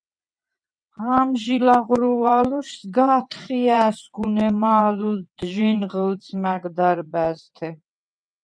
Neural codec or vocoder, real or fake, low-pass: vocoder, 22.05 kHz, 80 mel bands, WaveNeXt; fake; 9.9 kHz